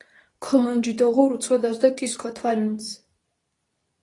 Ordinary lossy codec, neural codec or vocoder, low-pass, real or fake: AAC, 32 kbps; codec, 24 kHz, 0.9 kbps, WavTokenizer, medium speech release version 2; 10.8 kHz; fake